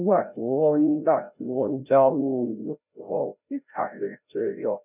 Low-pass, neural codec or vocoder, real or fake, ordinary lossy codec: 3.6 kHz; codec, 16 kHz, 0.5 kbps, FreqCodec, larger model; fake; none